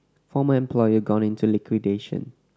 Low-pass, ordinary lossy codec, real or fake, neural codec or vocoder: none; none; real; none